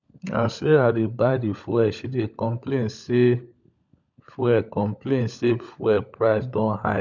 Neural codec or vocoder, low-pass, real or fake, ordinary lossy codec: codec, 16 kHz, 16 kbps, FunCodec, trained on LibriTTS, 50 frames a second; 7.2 kHz; fake; none